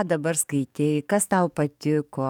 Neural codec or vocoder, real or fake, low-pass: codec, 44.1 kHz, 7.8 kbps, DAC; fake; 19.8 kHz